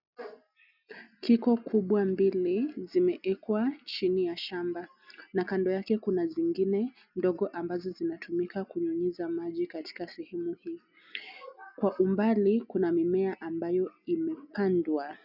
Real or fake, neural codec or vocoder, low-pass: real; none; 5.4 kHz